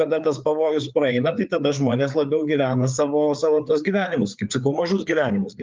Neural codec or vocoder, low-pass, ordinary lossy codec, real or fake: codec, 16 kHz, 4 kbps, FreqCodec, larger model; 7.2 kHz; Opus, 24 kbps; fake